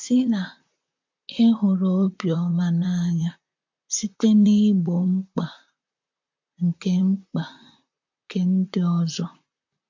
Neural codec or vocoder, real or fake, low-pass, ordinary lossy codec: vocoder, 44.1 kHz, 128 mel bands, Pupu-Vocoder; fake; 7.2 kHz; MP3, 48 kbps